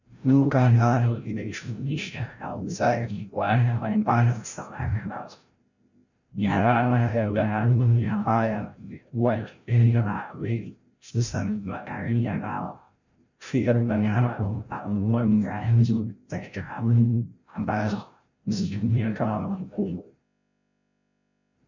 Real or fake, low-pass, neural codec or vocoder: fake; 7.2 kHz; codec, 16 kHz, 0.5 kbps, FreqCodec, larger model